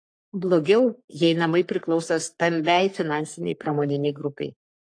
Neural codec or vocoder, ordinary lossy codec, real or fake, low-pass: codec, 44.1 kHz, 3.4 kbps, Pupu-Codec; AAC, 48 kbps; fake; 9.9 kHz